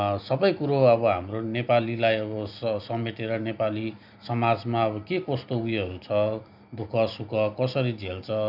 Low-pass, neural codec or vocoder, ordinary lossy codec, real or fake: 5.4 kHz; none; none; real